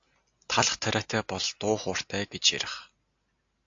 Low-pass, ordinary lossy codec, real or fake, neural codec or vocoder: 7.2 kHz; AAC, 48 kbps; real; none